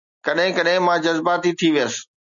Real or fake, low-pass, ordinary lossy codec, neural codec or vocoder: real; 7.2 kHz; MP3, 96 kbps; none